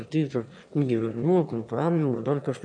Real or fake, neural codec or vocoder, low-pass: fake; autoencoder, 22.05 kHz, a latent of 192 numbers a frame, VITS, trained on one speaker; 9.9 kHz